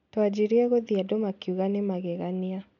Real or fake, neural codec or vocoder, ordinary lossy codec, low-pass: real; none; none; 7.2 kHz